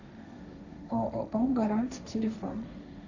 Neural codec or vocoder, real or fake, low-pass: codec, 16 kHz, 1.1 kbps, Voila-Tokenizer; fake; 7.2 kHz